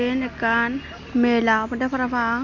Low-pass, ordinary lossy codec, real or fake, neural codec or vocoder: 7.2 kHz; none; real; none